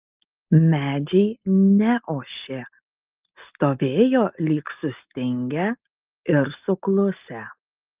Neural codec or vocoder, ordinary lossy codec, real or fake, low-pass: none; Opus, 24 kbps; real; 3.6 kHz